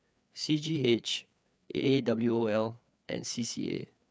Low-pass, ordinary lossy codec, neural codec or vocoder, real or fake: none; none; codec, 16 kHz, 4 kbps, FreqCodec, larger model; fake